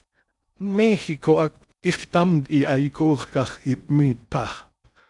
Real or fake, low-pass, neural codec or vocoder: fake; 10.8 kHz; codec, 16 kHz in and 24 kHz out, 0.6 kbps, FocalCodec, streaming, 2048 codes